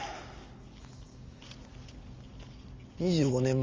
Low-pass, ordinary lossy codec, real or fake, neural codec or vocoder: 7.2 kHz; Opus, 32 kbps; real; none